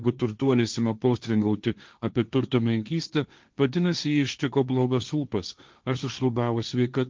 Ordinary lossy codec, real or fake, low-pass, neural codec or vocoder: Opus, 32 kbps; fake; 7.2 kHz; codec, 16 kHz, 1.1 kbps, Voila-Tokenizer